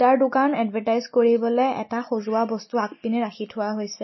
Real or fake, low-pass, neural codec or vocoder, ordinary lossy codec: real; 7.2 kHz; none; MP3, 24 kbps